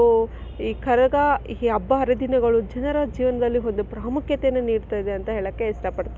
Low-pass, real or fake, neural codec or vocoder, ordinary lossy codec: none; real; none; none